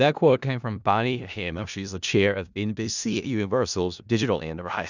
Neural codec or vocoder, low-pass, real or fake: codec, 16 kHz in and 24 kHz out, 0.4 kbps, LongCat-Audio-Codec, four codebook decoder; 7.2 kHz; fake